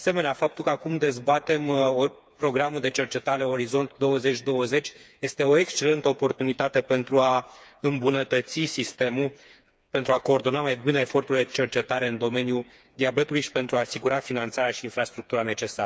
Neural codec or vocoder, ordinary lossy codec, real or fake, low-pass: codec, 16 kHz, 4 kbps, FreqCodec, smaller model; none; fake; none